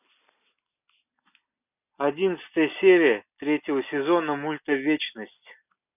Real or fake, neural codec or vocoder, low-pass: real; none; 3.6 kHz